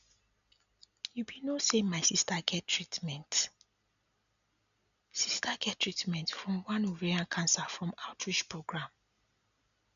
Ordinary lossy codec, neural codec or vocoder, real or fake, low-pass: none; none; real; 7.2 kHz